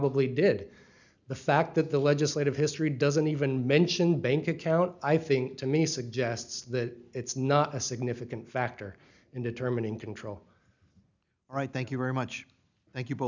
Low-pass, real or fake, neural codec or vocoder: 7.2 kHz; real; none